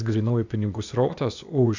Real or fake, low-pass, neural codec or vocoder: fake; 7.2 kHz; codec, 16 kHz, 0.8 kbps, ZipCodec